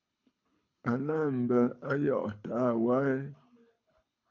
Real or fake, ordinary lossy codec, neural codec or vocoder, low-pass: fake; AAC, 48 kbps; codec, 24 kHz, 6 kbps, HILCodec; 7.2 kHz